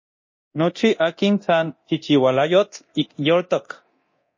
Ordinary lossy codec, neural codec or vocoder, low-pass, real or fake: MP3, 32 kbps; codec, 24 kHz, 0.9 kbps, DualCodec; 7.2 kHz; fake